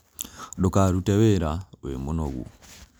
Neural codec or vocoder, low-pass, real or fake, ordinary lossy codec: none; none; real; none